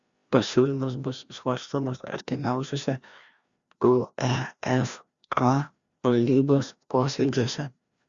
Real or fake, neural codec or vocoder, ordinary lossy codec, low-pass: fake; codec, 16 kHz, 1 kbps, FreqCodec, larger model; Opus, 64 kbps; 7.2 kHz